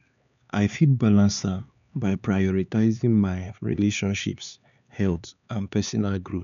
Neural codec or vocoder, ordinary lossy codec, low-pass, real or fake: codec, 16 kHz, 4 kbps, X-Codec, HuBERT features, trained on LibriSpeech; none; 7.2 kHz; fake